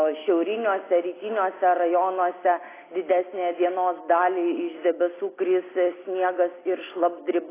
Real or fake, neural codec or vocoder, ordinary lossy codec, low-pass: real; none; AAC, 16 kbps; 3.6 kHz